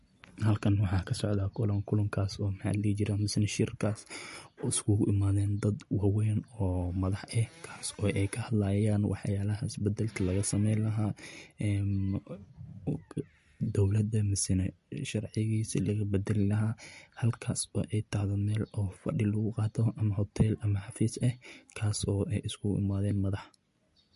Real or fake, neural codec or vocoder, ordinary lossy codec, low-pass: real; none; MP3, 48 kbps; 14.4 kHz